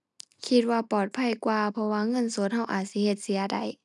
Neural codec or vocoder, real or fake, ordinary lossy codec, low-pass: none; real; none; 10.8 kHz